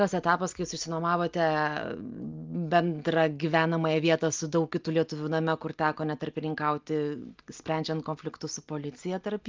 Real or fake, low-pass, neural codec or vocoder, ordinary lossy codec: real; 7.2 kHz; none; Opus, 24 kbps